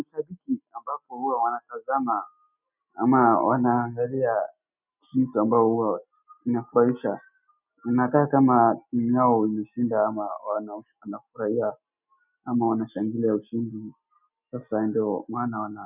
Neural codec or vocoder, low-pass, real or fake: none; 3.6 kHz; real